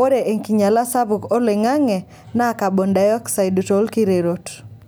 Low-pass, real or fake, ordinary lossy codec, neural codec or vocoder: none; real; none; none